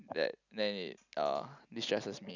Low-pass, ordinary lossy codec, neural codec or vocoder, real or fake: 7.2 kHz; none; none; real